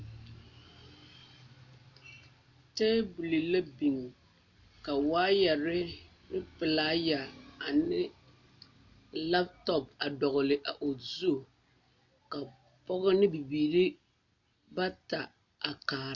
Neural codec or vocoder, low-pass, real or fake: none; 7.2 kHz; real